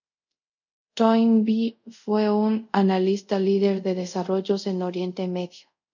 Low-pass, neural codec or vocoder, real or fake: 7.2 kHz; codec, 24 kHz, 0.5 kbps, DualCodec; fake